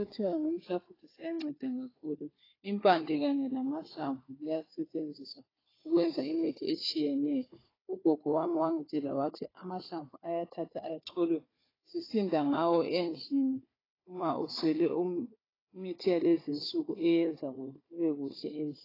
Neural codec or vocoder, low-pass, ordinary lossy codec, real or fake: codec, 16 kHz, 4 kbps, FunCodec, trained on Chinese and English, 50 frames a second; 5.4 kHz; AAC, 24 kbps; fake